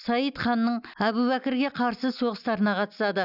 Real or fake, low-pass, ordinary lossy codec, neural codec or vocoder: real; 5.4 kHz; none; none